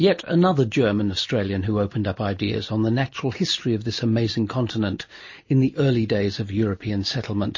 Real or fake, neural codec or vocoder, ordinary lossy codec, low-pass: real; none; MP3, 32 kbps; 7.2 kHz